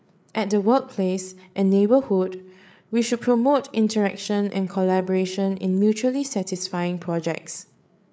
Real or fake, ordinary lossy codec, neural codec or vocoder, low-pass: fake; none; codec, 16 kHz, 8 kbps, FreqCodec, larger model; none